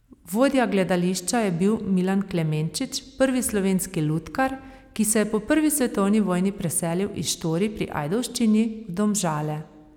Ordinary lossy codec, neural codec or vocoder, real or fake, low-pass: none; none; real; 19.8 kHz